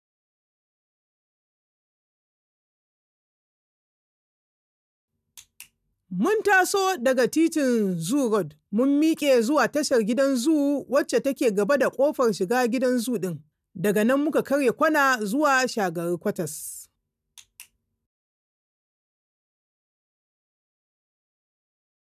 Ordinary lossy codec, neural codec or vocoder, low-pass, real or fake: none; none; 14.4 kHz; real